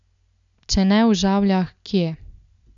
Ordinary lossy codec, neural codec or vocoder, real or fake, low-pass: none; none; real; 7.2 kHz